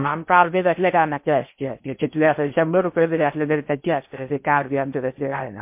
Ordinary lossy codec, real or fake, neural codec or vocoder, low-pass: MP3, 32 kbps; fake; codec, 16 kHz in and 24 kHz out, 0.6 kbps, FocalCodec, streaming, 2048 codes; 3.6 kHz